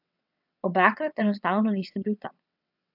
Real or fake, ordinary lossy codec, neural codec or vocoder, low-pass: fake; AAC, 48 kbps; vocoder, 22.05 kHz, 80 mel bands, WaveNeXt; 5.4 kHz